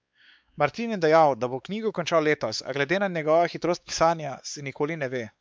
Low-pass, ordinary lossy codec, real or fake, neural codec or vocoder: none; none; fake; codec, 16 kHz, 4 kbps, X-Codec, WavLM features, trained on Multilingual LibriSpeech